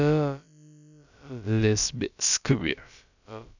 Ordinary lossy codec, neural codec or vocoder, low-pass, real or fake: none; codec, 16 kHz, about 1 kbps, DyCAST, with the encoder's durations; 7.2 kHz; fake